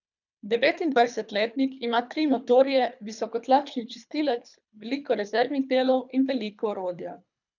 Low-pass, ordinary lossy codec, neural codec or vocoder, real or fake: 7.2 kHz; none; codec, 24 kHz, 3 kbps, HILCodec; fake